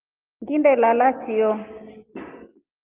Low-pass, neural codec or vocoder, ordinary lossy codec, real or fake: 3.6 kHz; none; Opus, 32 kbps; real